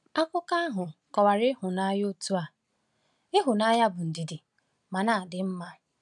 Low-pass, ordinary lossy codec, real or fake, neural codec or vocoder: 10.8 kHz; none; real; none